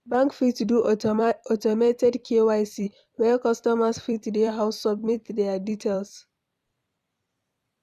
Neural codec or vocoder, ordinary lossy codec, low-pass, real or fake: vocoder, 44.1 kHz, 128 mel bands every 256 samples, BigVGAN v2; none; 14.4 kHz; fake